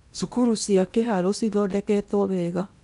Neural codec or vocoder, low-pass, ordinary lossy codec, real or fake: codec, 16 kHz in and 24 kHz out, 0.8 kbps, FocalCodec, streaming, 65536 codes; 10.8 kHz; none; fake